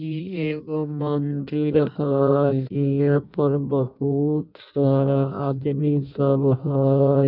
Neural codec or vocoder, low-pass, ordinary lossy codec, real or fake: codec, 16 kHz in and 24 kHz out, 0.6 kbps, FireRedTTS-2 codec; 5.4 kHz; none; fake